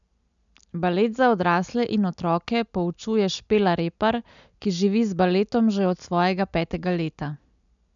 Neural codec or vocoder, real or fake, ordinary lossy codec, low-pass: none; real; none; 7.2 kHz